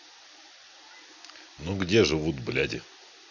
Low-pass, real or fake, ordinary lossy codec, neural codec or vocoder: 7.2 kHz; real; none; none